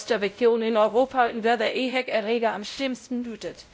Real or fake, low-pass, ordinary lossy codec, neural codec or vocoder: fake; none; none; codec, 16 kHz, 0.5 kbps, X-Codec, WavLM features, trained on Multilingual LibriSpeech